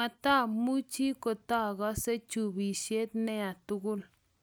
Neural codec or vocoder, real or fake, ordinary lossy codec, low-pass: none; real; none; none